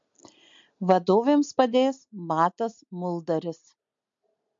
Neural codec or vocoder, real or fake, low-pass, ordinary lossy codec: none; real; 7.2 kHz; MP3, 48 kbps